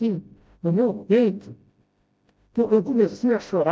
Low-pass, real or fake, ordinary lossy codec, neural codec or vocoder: none; fake; none; codec, 16 kHz, 0.5 kbps, FreqCodec, smaller model